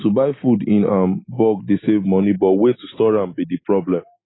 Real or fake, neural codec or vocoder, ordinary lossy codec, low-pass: real; none; AAC, 16 kbps; 7.2 kHz